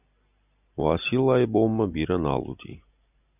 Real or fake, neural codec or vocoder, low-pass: real; none; 3.6 kHz